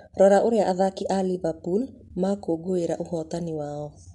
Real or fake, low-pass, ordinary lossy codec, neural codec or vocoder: real; 10.8 kHz; MP3, 64 kbps; none